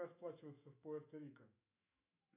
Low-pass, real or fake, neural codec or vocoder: 3.6 kHz; fake; autoencoder, 48 kHz, 128 numbers a frame, DAC-VAE, trained on Japanese speech